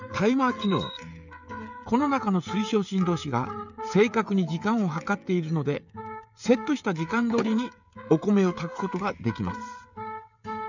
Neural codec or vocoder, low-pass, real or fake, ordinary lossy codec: codec, 16 kHz, 16 kbps, FreqCodec, smaller model; 7.2 kHz; fake; none